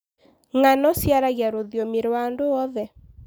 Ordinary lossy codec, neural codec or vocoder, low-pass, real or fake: none; none; none; real